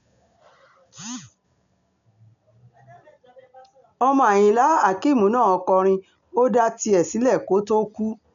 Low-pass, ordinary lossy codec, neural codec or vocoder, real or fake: 7.2 kHz; none; none; real